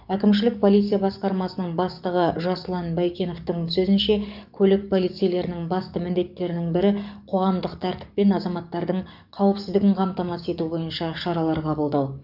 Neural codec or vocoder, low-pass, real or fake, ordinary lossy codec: codec, 44.1 kHz, 7.8 kbps, DAC; 5.4 kHz; fake; none